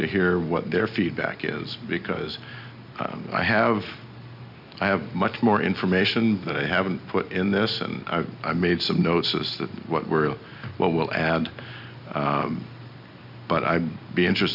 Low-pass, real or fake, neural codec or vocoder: 5.4 kHz; real; none